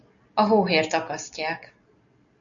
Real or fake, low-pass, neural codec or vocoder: real; 7.2 kHz; none